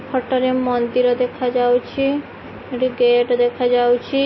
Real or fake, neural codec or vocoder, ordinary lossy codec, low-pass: real; none; MP3, 24 kbps; 7.2 kHz